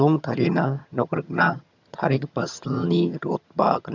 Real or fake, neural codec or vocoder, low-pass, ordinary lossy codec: fake; vocoder, 22.05 kHz, 80 mel bands, HiFi-GAN; 7.2 kHz; none